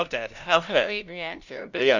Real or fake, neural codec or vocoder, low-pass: fake; codec, 16 kHz, 0.5 kbps, FunCodec, trained on LibriTTS, 25 frames a second; 7.2 kHz